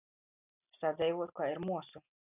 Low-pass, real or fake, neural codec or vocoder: 3.6 kHz; real; none